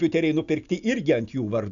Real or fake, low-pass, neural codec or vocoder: real; 7.2 kHz; none